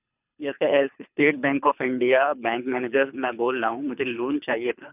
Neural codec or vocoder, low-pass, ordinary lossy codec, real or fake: codec, 24 kHz, 3 kbps, HILCodec; 3.6 kHz; none; fake